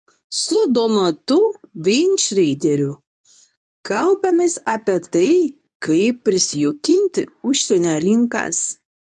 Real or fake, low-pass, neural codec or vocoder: fake; 10.8 kHz; codec, 24 kHz, 0.9 kbps, WavTokenizer, medium speech release version 2